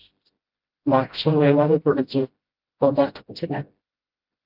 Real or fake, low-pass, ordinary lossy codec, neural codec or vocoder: fake; 5.4 kHz; Opus, 32 kbps; codec, 16 kHz, 0.5 kbps, FreqCodec, smaller model